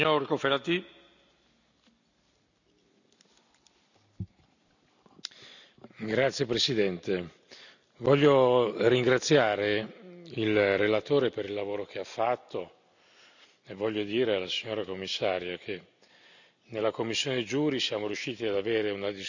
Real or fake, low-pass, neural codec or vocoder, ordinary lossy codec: real; 7.2 kHz; none; none